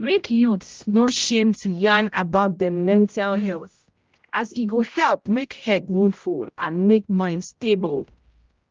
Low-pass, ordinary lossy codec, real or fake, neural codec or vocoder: 7.2 kHz; Opus, 32 kbps; fake; codec, 16 kHz, 0.5 kbps, X-Codec, HuBERT features, trained on general audio